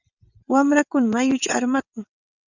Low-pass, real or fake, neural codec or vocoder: 7.2 kHz; fake; vocoder, 22.05 kHz, 80 mel bands, WaveNeXt